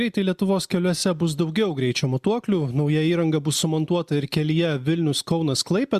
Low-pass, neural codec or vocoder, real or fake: 14.4 kHz; none; real